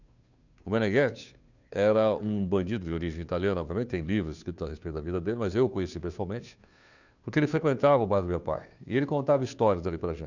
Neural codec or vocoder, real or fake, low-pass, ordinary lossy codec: codec, 16 kHz, 2 kbps, FunCodec, trained on Chinese and English, 25 frames a second; fake; 7.2 kHz; none